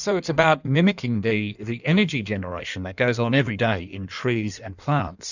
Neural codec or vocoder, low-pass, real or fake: codec, 16 kHz in and 24 kHz out, 1.1 kbps, FireRedTTS-2 codec; 7.2 kHz; fake